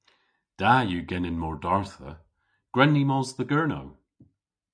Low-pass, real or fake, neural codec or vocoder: 9.9 kHz; real; none